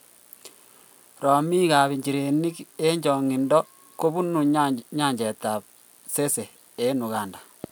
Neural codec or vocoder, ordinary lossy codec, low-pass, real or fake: none; none; none; real